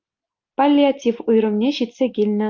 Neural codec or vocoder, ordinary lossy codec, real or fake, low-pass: none; Opus, 24 kbps; real; 7.2 kHz